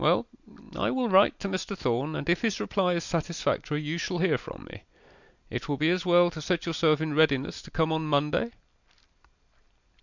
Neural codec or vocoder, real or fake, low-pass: none; real; 7.2 kHz